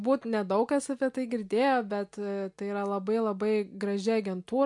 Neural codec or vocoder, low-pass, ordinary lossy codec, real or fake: none; 10.8 kHz; MP3, 64 kbps; real